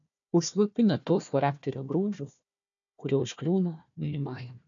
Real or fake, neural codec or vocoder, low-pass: fake; codec, 16 kHz, 1 kbps, FunCodec, trained on Chinese and English, 50 frames a second; 7.2 kHz